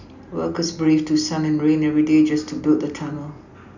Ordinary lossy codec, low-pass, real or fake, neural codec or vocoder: none; 7.2 kHz; real; none